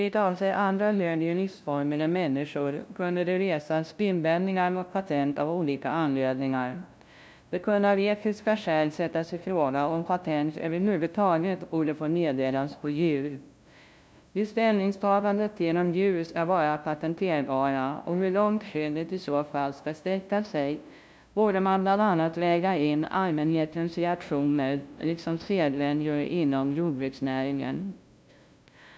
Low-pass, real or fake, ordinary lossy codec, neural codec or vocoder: none; fake; none; codec, 16 kHz, 0.5 kbps, FunCodec, trained on LibriTTS, 25 frames a second